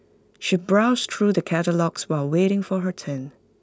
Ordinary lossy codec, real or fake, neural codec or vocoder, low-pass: none; real; none; none